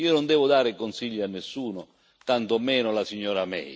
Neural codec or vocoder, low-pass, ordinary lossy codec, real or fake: none; none; none; real